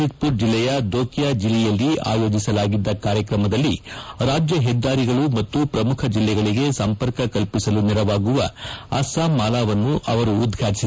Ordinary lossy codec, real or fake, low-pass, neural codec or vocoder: none; real; none; none